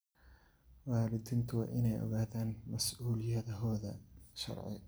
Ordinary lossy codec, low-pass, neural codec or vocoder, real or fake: none; none; none; real